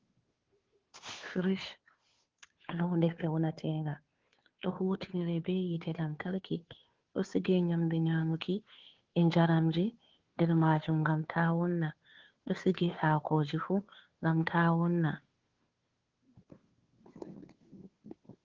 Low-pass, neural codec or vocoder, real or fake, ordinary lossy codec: 7.2 kHz; codec, 16 kHz, 2 kbps, FunCodec, trained on Chinese and English, 25 frames a second; fake; Opus, 16 kbps